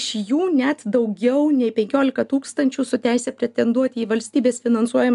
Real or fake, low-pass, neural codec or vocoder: real; 10.8 kHz; none